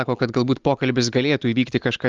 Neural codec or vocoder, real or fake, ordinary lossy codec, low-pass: none; real; Opus, 32 kbps; 7.2 kHz